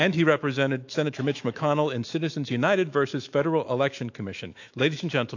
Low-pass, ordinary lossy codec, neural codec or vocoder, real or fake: 7.2 kHz; AAC, 48 kbps; autoencoder, 48 kHz, 128 numbers a frame, DAC-VAE, trained on Japanese speech; fake